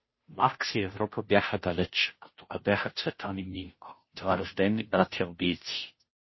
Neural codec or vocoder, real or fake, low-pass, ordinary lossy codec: codec, 16 kHz, 0.5 kbps, FunCodec, trained on Chinese and English, 25 frames a second; fake; 7.2 kHz; MP3, 24 kbps